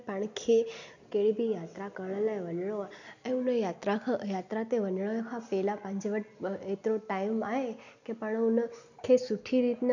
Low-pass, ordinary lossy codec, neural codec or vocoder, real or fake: 7.2 kHz; none; none; real